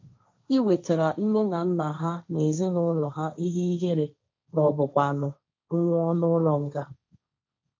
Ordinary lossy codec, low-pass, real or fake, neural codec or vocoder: MP3, 64 kbps; 7.2 kHz; fake; codec, 16 kHz, 1.1 kbps, Voila-Tokenizer